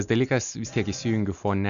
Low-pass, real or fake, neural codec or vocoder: 7.2 kHz; real; none